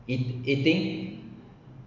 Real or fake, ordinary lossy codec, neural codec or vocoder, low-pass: real; none; none; 7.2 kHz